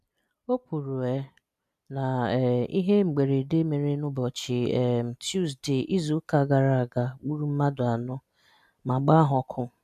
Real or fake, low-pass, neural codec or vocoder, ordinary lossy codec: real; 14.4 kHz; none; none